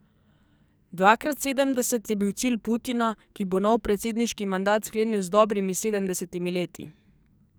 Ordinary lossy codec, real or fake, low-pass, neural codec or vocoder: none; fake; none; codec, 44.1 kHz, 2.6 kbps, SNAC